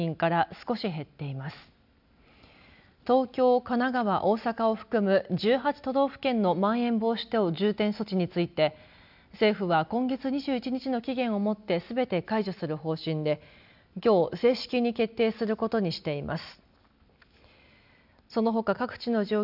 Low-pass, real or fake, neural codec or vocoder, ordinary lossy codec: 5.4 kHz; real; none; none